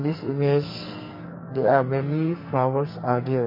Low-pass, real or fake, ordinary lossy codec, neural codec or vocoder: 5.4 kHz; fake; MP3, 24 kbps; codec, 44.1 kHz, 2.6 kbps, SNAC